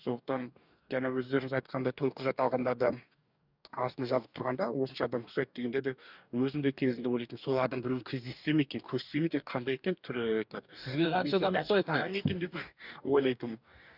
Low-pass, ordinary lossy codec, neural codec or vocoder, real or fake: 5.4 kHz; none; codec, 44.1 kHz, 2.6 kbps, DAC; fake